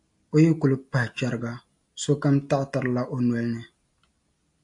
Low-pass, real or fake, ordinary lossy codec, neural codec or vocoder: 10.8 kHz; real; AAC, 64 kbps; none